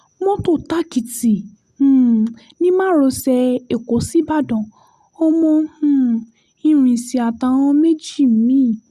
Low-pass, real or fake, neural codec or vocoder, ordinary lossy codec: 14.4 kHz; real; none; Opus, 64 kbps